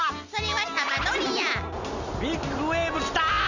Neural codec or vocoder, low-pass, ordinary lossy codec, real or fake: none; 7.2 kHz; Opus, 64 kbps; real